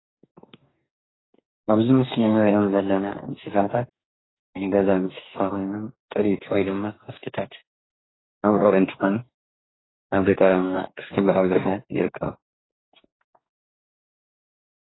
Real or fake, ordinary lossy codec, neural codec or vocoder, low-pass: fake; AAC, 16 kbps; codec, 44.1 kHz, 2.6 kbps, DAC; 7.2 kHz